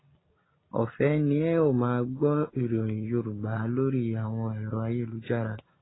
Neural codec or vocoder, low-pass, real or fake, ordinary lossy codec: none; 7.2 kHz; real; AAC, 16 kbps